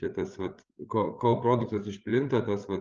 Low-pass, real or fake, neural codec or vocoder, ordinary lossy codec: 7.2 kHz; fake; codec, 16 kHz, 8 kbps, FreqCodec, larger model; Opus, 24 kbps